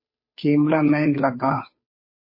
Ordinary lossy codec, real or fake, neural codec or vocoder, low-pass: MP3, 24 kbps; fake; codec, 16 kHz, 2 kbps, FunCodec, trained on Chinese and English, 25 frames a second; 5.4 kHz